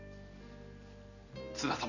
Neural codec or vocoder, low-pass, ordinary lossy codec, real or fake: none; 7.2 kHz; none; real